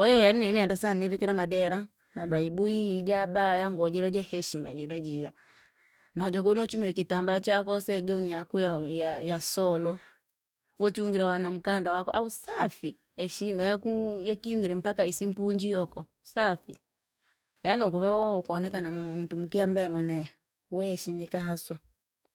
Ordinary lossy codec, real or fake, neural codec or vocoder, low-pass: none; fake; codec, 44.1 kHz, 2.6 kbps, DAC; 19.8 kHz